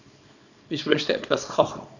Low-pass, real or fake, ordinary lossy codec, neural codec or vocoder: 7.2 kHz; fake; none; codec, 24 kHz, 0.9 kbps, WavTokenizer, small release